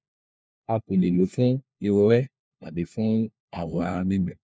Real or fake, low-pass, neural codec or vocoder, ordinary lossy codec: fake; none; codec, 16 kHz, 1 kbps, FunCodec, trained on LibriTTS, 50 frames a second; none